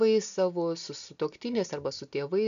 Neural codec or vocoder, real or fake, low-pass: none; real; 7.2 kHz